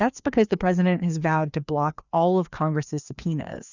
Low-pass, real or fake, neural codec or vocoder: 7.2 kHz; fake; codec, 16 kHz, 2 kbps, FreqCodec, larger model